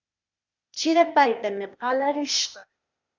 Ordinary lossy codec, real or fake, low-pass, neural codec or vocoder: Opus, 64 kbps; fake; 7.2 kHz; codec, 16 kHz, 0.8 kbps, ZipCodec